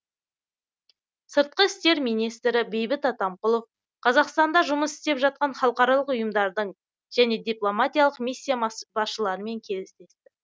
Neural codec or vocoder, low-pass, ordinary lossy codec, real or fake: none; none; none; real